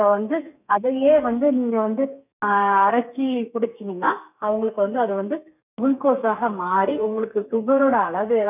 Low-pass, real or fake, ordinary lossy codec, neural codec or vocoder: 3.6 kHz; fake; none; codec, 32 kHz, 1.9 kbps, SNAC